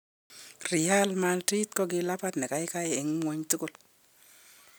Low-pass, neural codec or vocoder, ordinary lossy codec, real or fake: none; none; none; real